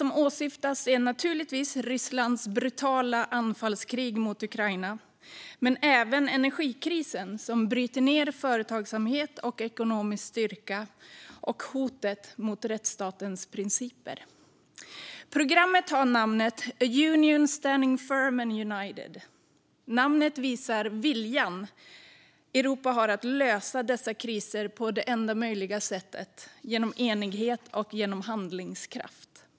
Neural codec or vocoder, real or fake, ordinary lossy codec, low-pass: none; real; none; none